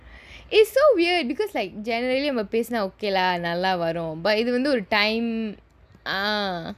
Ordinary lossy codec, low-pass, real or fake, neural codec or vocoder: none; 14.4 kHz; real; none